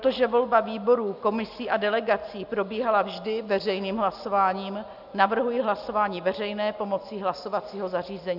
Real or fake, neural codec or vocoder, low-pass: real; none; 5.4 kHz